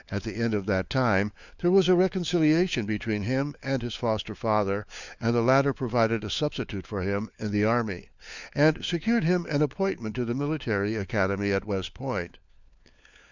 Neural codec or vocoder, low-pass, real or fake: codec, 16 kHz, 4 kbps, FunCodec, trained on LibriTTS, 50 frames a second; 7.2 kHz; fake